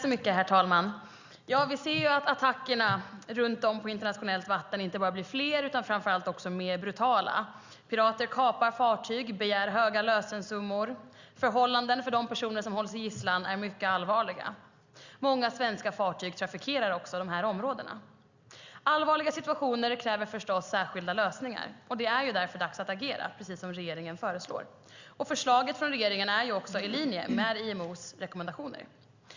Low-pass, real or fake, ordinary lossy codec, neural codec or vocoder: 7.2 kHz; real; Opus, 64 kbps; none